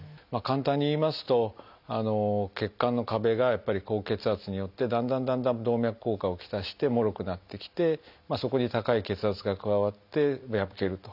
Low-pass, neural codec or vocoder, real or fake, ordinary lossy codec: 5.4 kHz; none; real; none